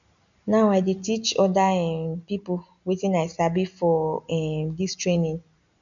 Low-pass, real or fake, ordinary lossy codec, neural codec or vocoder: 7.2 kHz; real; none; none